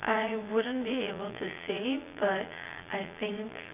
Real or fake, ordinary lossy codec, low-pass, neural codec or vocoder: fake; none; 3.6 kHz; vocoder, 22.05 kHz, 80 mel bands, Vocos